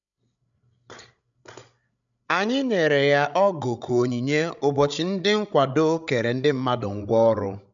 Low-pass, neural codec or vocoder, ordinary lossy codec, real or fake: 7.2 kHz; codec, 16 kHz, 16 kbps, FreqCodec, larger model; none; fake